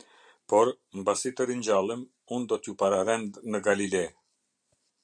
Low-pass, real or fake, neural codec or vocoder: 9.9 kHz; real; none